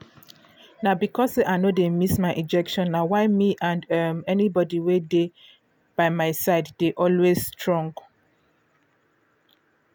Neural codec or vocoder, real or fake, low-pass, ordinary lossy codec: none; real; none; none